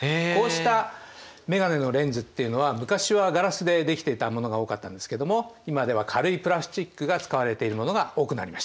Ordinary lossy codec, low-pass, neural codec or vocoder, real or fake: none; none; none; real